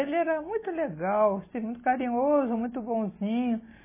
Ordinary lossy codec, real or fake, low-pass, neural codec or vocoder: MP3, 16 kbps; real; 3.6 kHz; none